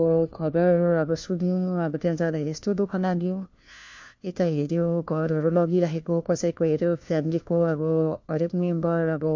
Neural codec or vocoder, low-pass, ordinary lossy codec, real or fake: codec, 16 kHz, 1 kbps, FunCodec, trained on LibriTTS, 50 frames a second; 7.2 kHz; MP3, 48 kbps; fake